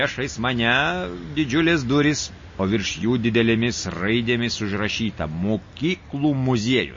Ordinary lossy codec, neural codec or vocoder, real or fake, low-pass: MP3, 32 kbps; none; real; 7.2 kHz